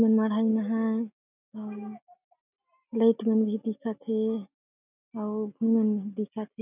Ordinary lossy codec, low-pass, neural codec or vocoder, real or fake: none; 3.6 kHz; none; real